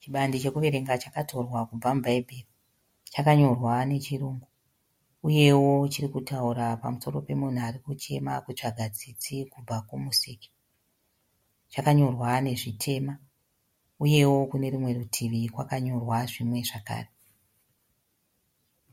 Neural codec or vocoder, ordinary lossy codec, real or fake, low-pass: none; MP3, 64 kbps; real; 19.8 kHz